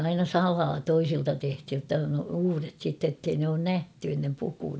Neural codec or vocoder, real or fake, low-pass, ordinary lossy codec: none; real; none; none